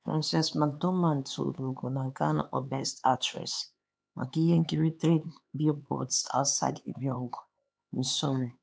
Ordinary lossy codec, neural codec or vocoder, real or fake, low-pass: none; codec, 16 kHz, 4 kbps, X-Codec, HuBERT features, trained on LibriSpeech; fake; none